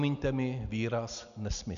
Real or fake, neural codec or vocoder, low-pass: real; none; 7.2 kHz